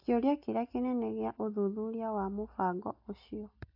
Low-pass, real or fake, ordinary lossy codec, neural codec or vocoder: 5.4 kHz; real; AAC, 48 kbps; none